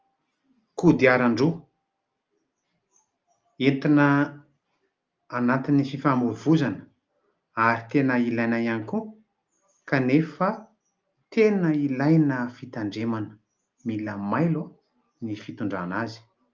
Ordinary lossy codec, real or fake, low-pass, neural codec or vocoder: Opus, 24 kbps; real; 7.2 kHz; none